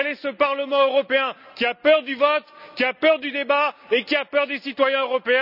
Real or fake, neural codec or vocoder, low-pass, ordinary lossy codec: real; none; 5.4 kHz; none